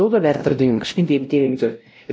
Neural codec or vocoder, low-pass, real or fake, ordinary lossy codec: codec, 16 kHz, 0.5 kbps, X-Codec, WavLM features, trained on Multilingual LibriSpeech; none; fake; none